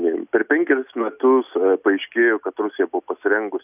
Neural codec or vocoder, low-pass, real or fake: none; 3.6 kHz; real